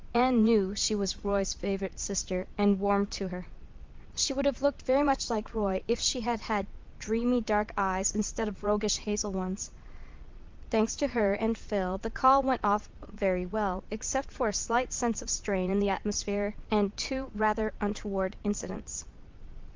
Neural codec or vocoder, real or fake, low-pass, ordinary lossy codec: vocoder, 22.05 kHz, 80 mel bands, Vocos; fake; 7.2 kHz; Opus, 32 kbps